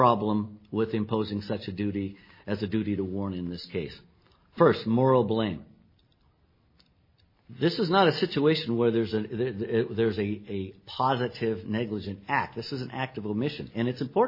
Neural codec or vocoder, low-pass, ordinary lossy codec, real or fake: none; 5.4 kHz; MP3, 24 kbps; real